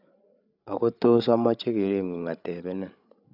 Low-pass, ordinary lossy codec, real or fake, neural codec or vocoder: 5.4 kHz; none; fake; codec, 16 kHz, 8 kbps, FreqCodec, larger model